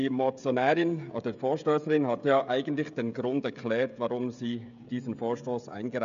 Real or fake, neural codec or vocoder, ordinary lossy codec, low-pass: fake; codec, 16 kHz, 16 kbps, FreqCodec, smaller model; none; 7.2 kHz